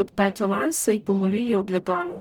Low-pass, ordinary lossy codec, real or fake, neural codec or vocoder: none; none; fake; codec, 44.1 kHz, 0.9 kbps, DAC